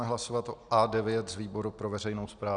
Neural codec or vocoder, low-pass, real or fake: none; 9.9 kHz; real